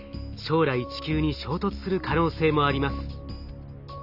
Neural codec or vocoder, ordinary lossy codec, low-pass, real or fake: none; none; 5.4 kHz; real